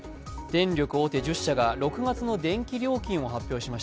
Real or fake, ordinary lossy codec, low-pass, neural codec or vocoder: real; none; none; none